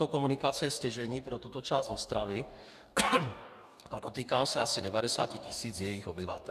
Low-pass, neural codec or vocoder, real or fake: 14.4 kHz; codec, 44.1 kHz, 2.6 kbps, DAC; fake